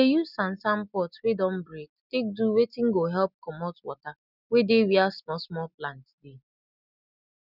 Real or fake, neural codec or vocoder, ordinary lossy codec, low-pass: real; none; none; 5.4 kHz